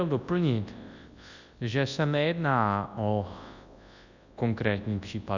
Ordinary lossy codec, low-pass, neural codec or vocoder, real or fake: Opus, 64 kbps; 7.2 kHz; codec, 24 kHz, 0.9 kbps, WavTokenizer, large speech release; fake